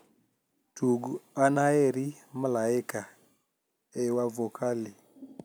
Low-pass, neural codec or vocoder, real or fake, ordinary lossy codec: none; none; real; none